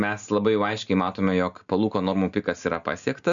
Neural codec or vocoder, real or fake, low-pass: none; real; 7.2 kHz